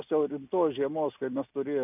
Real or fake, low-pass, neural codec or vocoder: real; 3.6 kHz; none